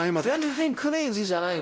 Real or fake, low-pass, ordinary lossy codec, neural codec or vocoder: fake; none; none; codec, 16 kHz, 0.5 kbps, X-Codec, WavLM features, trained on Multilingual LibriSpeech